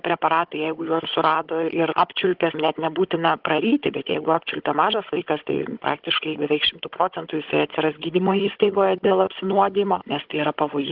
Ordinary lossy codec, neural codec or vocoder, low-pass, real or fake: Opus, 16 kbps; codec, 16 kHz, 16 kbps, FunCodec, trained on Chinese and English, 50 frames a second; 5.4 kHz; fake